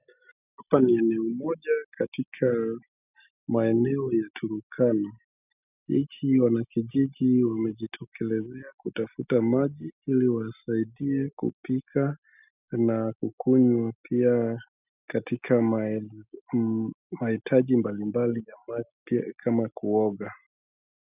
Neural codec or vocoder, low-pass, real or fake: none; 3.6 kHz; real